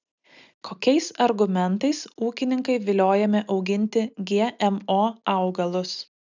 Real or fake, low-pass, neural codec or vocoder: real; 7.2 kHz; none